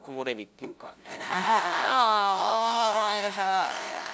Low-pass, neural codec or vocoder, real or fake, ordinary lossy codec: none; codec, 16 kHz, 0.5 kbps, FunCodec, trained on LibriTTS, 25 frames a second; fake; none